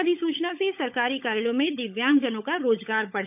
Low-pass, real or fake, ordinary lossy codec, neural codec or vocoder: 3.6 kHz; fake; none; codec, 16 kHz, 16 kbps, FunCodec, trained on LibriTTS, 50 frames a second